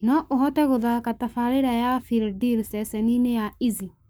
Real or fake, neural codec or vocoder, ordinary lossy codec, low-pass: fake; codec, 44.1 kHz, 7.8 kbps, Pupu-Codec; none; none